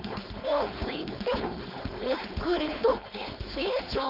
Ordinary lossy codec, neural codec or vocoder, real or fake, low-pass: none; codec, 16 kHz, 4.8 kbps, FACodec; fake; 5.4 kHz